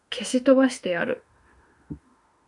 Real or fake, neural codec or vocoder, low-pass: fake; autoencoder, 48 kHz, 32 numbers a frame, DAC-VAE, trained on Japanese speech; 10.8 kHz